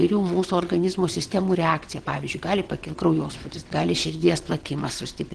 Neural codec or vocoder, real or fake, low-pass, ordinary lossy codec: vocoder, 22.05 kHz, 80 mel bands, WaveNeXt; fake; 9.9 kHz; Opus, 16 kbps